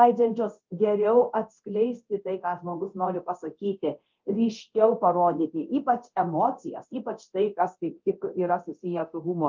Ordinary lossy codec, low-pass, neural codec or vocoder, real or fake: Opus, 32 kbps; 7.2 kHz; codec, 24 kHz, 0.9 kbps, DualCodec; fake